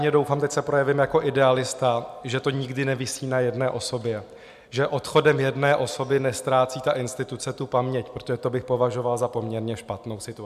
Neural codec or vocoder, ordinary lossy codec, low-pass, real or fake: none; MP3, 96 kbps; 14.4 kHz; real